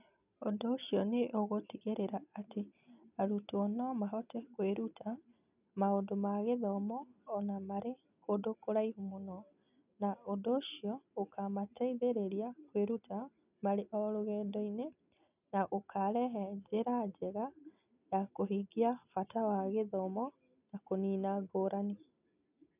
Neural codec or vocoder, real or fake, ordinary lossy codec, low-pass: none; real; none; 3.6 kHz